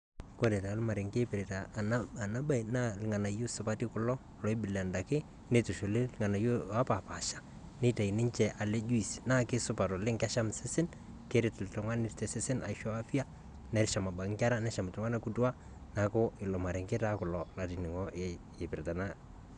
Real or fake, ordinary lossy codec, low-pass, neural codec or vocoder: real; none; 9.9 kHz; none